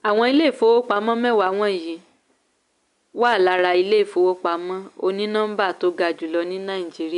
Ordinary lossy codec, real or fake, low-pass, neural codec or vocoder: none; real; 10.8 kHz; none